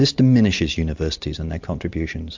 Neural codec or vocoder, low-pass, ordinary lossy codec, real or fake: none; 7.2 kHz; MP3, 64 kbps; real